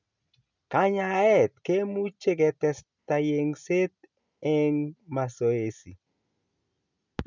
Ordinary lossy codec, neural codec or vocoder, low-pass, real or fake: none; none; 7.2 kHz; real